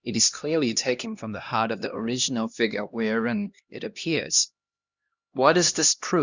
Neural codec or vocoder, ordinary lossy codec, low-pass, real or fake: codec, 16 kHz, 1 kbps, X-Codec, HuBERT features, trained on LibriSpeech; Opus, 64 kbps; 7.2 kHz; fake